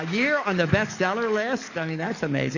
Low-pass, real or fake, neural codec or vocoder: 7.2 kHz; fake; codec, 44.1 kHz, 7.8 kbps, DAC